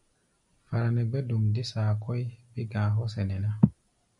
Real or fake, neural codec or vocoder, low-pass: real; none; 10.8 kHz